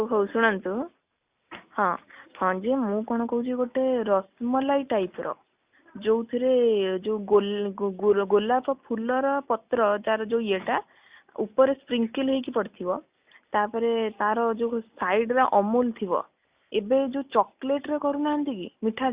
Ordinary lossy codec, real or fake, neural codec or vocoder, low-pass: Opus, 64 kbps; real; none; 3.6 kHz